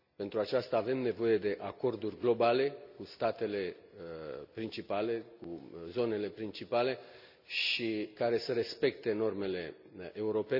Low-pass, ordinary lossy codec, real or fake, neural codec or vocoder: 5.4 kHz; none; real; none